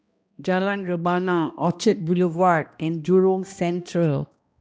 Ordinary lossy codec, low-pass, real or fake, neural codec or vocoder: none; none; fake; codec, 16 kHz, 1 kbps, X-Codec, HuBERT features, trained on balanced general audio